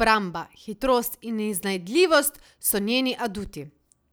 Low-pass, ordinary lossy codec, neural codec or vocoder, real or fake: none; none; none; real